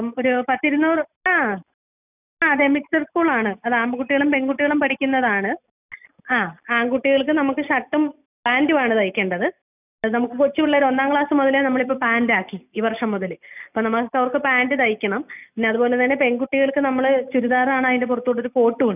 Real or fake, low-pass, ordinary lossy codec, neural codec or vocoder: real; 3.6 kHz; none; none